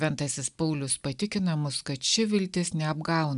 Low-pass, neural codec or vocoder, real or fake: 10.8 kHz; none; real